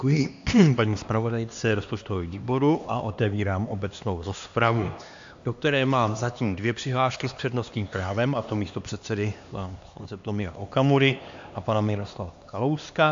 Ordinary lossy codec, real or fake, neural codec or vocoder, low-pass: AAC, 64 kbps; fake; codec, 16 kHz, 2 kbps, X-Codec, HuBERT features, trained on LibriSpeech; 7.2 kHz